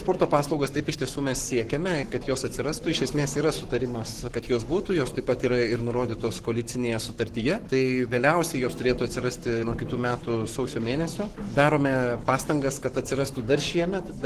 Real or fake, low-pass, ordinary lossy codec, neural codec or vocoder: fake; 14.4 kHz; Opus, 16 kbps; codec, 44.1 kHz, 7.8 kbps, Pupu-Codec